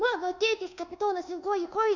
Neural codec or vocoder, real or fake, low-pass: codec, 24 kHz, 1.2 kbps, DualCodec; fake; 7.2 kHz